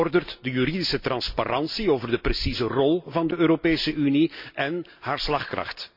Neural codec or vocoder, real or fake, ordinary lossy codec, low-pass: none; real; MP3, 48 kbps; 5.4 kHz